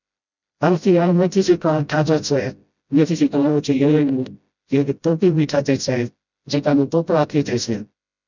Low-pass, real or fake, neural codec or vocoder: 7.2 kHz; fake; codec, 16 kHz, 0.5 kbps, FreqCodec, smaller model